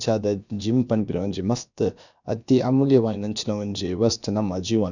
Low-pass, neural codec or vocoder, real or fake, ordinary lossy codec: 7.2 kHz; codec, 16 kHz, about 1 kbps, DyCAST, with the encoder's durations; fake; none